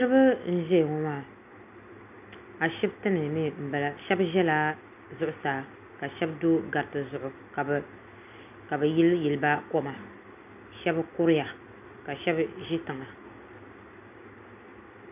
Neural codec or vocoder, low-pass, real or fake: none; 3.6 kHz; real